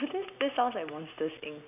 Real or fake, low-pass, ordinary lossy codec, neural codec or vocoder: real; 3.6 kHz; none; none